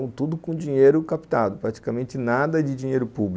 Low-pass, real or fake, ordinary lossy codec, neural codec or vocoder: none; real; none; none